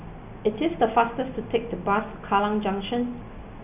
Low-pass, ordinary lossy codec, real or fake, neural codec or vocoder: 3.6 kHz; none; real; none